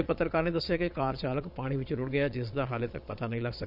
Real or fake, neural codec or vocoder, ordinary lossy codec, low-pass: fake; vocoder, 22.05 kHz, 80 mel bands, Vocos; none; 5.4 kHz